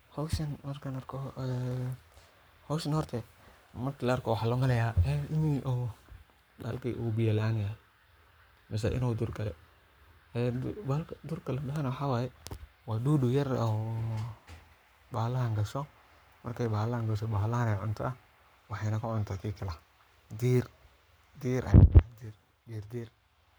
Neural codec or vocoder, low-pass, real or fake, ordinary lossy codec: codec, 44.1 kHz, 7.8 kbps, Pupu-Codec; none; fake; none